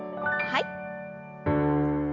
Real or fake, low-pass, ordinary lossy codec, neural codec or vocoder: real; 7.2 kHz; none; none